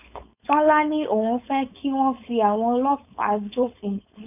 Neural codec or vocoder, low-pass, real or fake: codec, 16 kHz, 4.8 kbps, FACodec; 3.6 kHz; fake